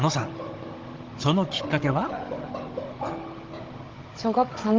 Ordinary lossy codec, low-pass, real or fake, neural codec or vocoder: Opus, 16 kbps; 7.2 kHz; fake; codec, 16 kHz, 16 kbps, FunCodec, trained on Chinese and English, 50 frames a second